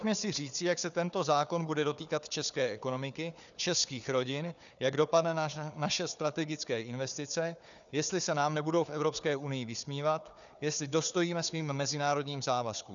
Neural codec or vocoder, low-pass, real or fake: codec, 16 kHz, 4 kbps, FunCodec, trained on Chinese and English, 50 frames a second; 7.2 kHz; fake